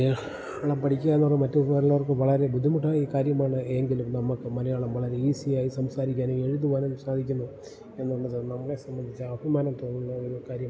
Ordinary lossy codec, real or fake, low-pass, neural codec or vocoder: none; real; none; none